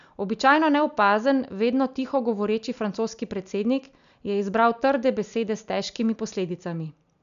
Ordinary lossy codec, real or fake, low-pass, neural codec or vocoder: none; real; 7.2 kHz; none